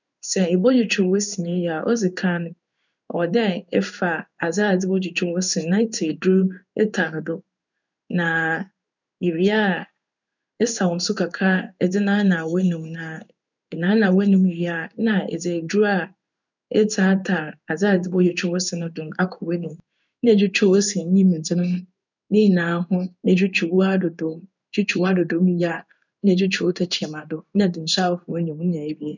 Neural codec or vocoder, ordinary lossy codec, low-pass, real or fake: codec, 16 kHz in and 24 kHz out, 1 kbps, XY-Tokenizer; none; 7.2 kHz; fake